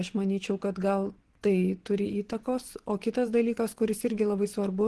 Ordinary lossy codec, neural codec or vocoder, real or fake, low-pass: Opus, 16 kbps; vocoder, 24 kHz, 100 mel bands, Vocos; fake; 10.8 kHz